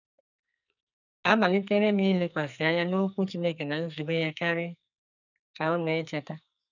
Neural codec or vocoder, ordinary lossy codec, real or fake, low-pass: codec, 44.1 kHz, 2.6 kbps, SNAC; none; fake; 7.2 kHz